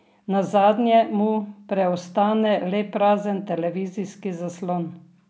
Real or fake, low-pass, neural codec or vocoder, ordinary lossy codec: real; none; none; none